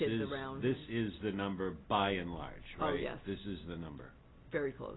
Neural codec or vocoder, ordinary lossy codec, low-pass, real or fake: none; AAC, 16 kbps; 7.2 kHz; real